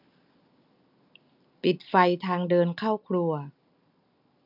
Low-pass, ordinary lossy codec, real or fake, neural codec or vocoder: 5.4 kHz; none; real; none